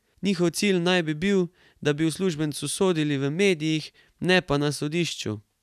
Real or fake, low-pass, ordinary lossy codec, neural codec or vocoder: real; 14.4 kHz; none; none